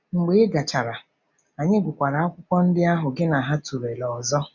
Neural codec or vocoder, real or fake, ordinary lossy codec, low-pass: none; real; none; 7.2 kHz